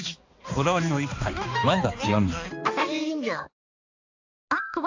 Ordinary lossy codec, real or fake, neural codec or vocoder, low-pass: AAC, 48 kbps; fake; codec, 16 kHz, 2 kbps, X-Codec, HuBERT features, trained on balanced general audio; 7.2 kHz